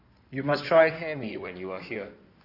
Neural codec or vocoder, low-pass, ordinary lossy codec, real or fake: codec, 16 kHz in and 24 kHz out, 2.2 kbps, FireRedTTS-2 codec; 5.4 kHz; none; fake